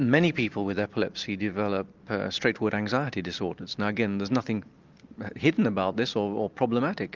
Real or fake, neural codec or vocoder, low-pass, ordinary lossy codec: real; none; 7.2 kHz; Opus, 32 kbps